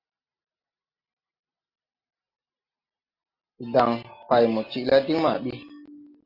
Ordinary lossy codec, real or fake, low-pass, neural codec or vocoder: AAC, 32 kbps; real; 5.4 kHz; none